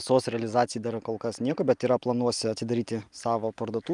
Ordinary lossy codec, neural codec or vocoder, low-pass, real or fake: Opus, 32 kbps; none; 10.8 kHz; real